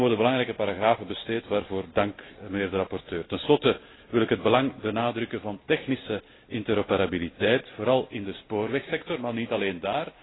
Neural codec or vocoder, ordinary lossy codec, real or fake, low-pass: none; AAC, 16 kbps; real; 7.2 kHz